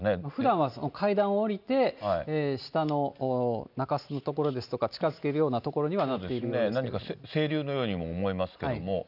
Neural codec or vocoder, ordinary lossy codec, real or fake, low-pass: none; none; real; 5.4 kHz